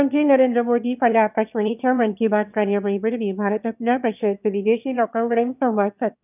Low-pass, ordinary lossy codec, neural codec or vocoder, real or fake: 3.6 kHz; none; autoencoder, 22.05 kHz, a latent of 192 numbers a frame, VITS, trained on one speaker; fake